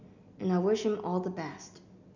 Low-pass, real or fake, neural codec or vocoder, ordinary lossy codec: 7.2 kHz; real; none; none